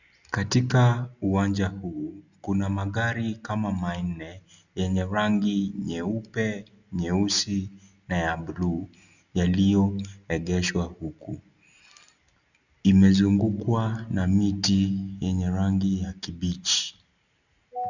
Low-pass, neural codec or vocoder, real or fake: 7.2 kHz; none; real